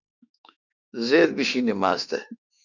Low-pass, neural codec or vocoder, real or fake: 7.2 kHz; autoencoder, 48 kHz, 32 numbers a frame, DAC-VAE, trained on Japanese speech; fake